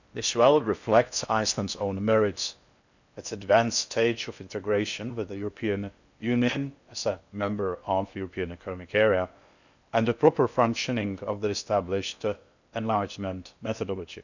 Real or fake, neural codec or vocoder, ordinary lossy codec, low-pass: fake; codec, 16 kHz in and 24 kHz out, 0.6 kbps, FocalCodec, streaming, 4096 codes; none; 7.2 kHz